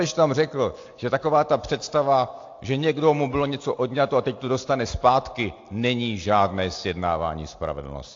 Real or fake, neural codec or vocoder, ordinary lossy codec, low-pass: real; none; AAC, 48 kbps; 7.2 kHz